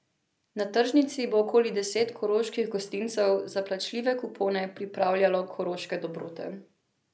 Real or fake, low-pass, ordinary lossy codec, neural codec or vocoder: real; none; none; none